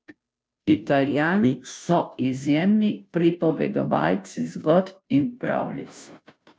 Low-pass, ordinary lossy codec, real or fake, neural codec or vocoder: none; none; fake; codec, 16 kHz, 0.5 kbps, FunCodec, trained on Chinese and English, 25 frames a second